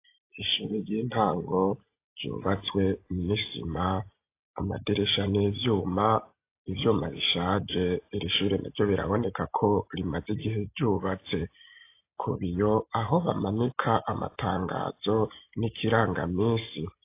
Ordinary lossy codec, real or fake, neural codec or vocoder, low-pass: AAC, 24 kbps; real; none; 3.6 kHz